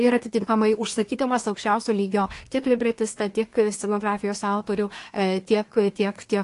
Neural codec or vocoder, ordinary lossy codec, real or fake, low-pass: codec, 24 kHz, 1 kbps, SNAC; AAC, 48 kbps; fake; 10.8 kHz